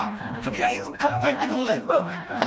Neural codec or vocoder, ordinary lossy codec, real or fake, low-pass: codec, 16 kHz, 1 kbps, FreqCodec, smaller model; none; fake; none